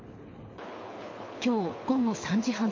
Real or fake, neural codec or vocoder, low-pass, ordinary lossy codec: fake; codec, 24 kHz, 6 kbps, HILCodec; 7.2 kHz; MP3, 32 kbps